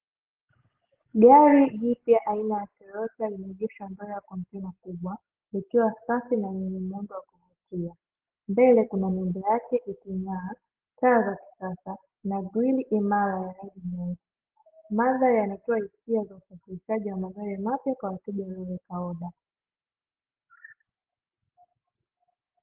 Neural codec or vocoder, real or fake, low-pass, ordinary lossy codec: none; real; 3.6 kHz; Opus, 16 kbps